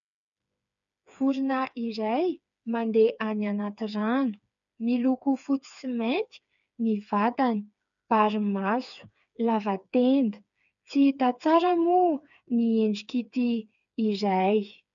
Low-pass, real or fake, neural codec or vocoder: 7.2 kHz; fake; codec, 16 kHz, 4 kbps, FreqCodec, smaller model